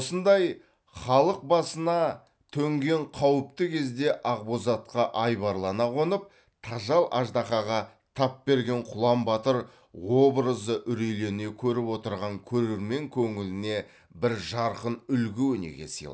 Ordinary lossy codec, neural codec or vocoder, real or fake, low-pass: none; none; real; none